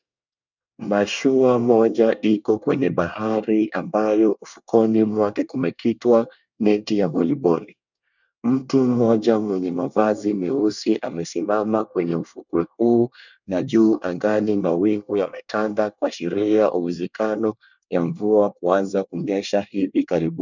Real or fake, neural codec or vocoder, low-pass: fake; codec, 24 kHz, 1 kbps, SNAC; 7.2 kHz